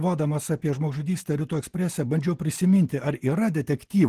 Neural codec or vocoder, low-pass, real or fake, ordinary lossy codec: none; 14.4 kHz; real; Opus, 16 kbps